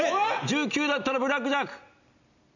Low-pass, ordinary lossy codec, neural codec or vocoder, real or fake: 7.2 kHz; none; none; real